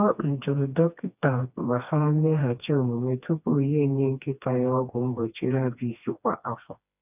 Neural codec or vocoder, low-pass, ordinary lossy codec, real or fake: codec, 16 kHz, 2 kbps, FreqCodec, smaller model; 3.6 kHz; none; fake